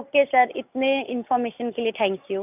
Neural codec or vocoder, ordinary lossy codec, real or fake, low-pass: none; none; real; 3.6 kHz